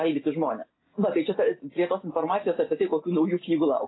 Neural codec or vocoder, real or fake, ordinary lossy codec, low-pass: none; real; AAC, 16 kbps; 7.2 kHz